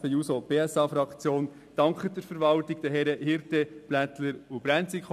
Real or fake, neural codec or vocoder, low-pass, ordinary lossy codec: real; none; 14.4 kHz; none